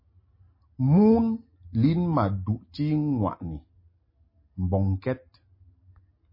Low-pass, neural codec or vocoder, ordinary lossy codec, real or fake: 5.4 kHz; none; MP3, 24 kbps; real